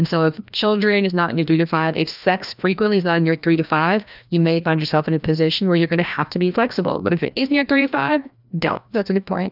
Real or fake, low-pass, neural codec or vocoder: fake; 5.4 kHz; codec, 16 kHz, 1 kbps, FreqCodec, larger model